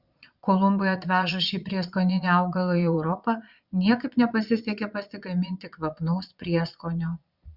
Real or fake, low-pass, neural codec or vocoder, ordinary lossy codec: fake; 5.4 kHz; vocoder, 22.05 kHz, 80 mel bands, Vocos; Opus, 64 kbps